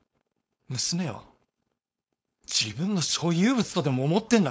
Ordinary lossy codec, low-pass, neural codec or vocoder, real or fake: none; none; codec, 16 kHz, 4.8 kbps, FACodec; fake